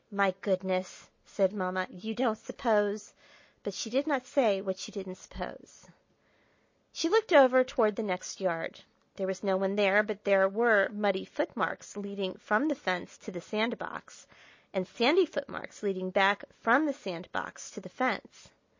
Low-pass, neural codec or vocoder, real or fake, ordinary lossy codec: 7.2 kHz; none; real; MP3, 32 kbps